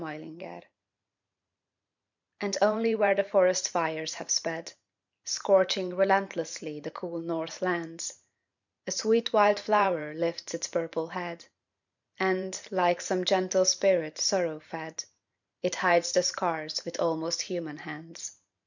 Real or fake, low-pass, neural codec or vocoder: fake; 7.2 kHz; vocoder, 44.1 kHz, 128 mel bands every 512 samples, BigVGAN v2